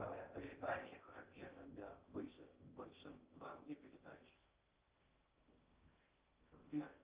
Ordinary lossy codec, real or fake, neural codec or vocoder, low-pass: Opus, 24 kbps; fake; codec, 16 kHz in and 24 kHz out, 0.6 kbps, FocalCodec, streaming, 4096 codes; 3.6 kHz